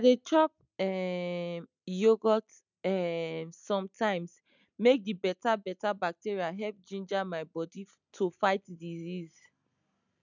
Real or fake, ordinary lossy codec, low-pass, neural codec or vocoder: real; none; 7.2 kHz; none